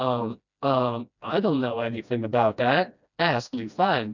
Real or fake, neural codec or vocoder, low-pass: fake; codec, 16 kHz, 1 kbps, FreqCodec, smaller model; 7.2 kHz